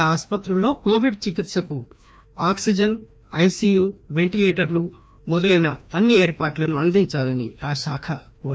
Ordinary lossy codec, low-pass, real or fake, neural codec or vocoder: none; none; fake; codec, 16 kHz, 1 kbps, FreqCodec, larger model